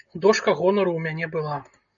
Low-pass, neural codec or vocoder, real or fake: 7.2 kHz; none; real